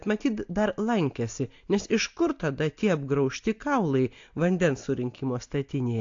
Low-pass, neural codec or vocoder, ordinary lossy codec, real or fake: 7.2 kHz; none; AAC, 48 kbps; real